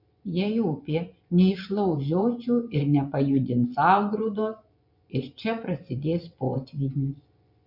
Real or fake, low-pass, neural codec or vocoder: fake; 5.4 kHz; vocoder, 24 kHz, 100 mel bands, Vocos